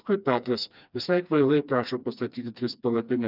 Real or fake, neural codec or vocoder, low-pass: fake; codec, 16 kHz, 2 kbps, FreqCodec, smaller model; 5.4 kHz